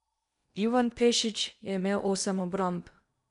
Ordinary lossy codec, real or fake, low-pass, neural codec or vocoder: none; fake; 10.8 kHz; codec, 16 kHz in and 24 kHz out, 0.8 kbps, FocalCodec, streaming, 65536 codes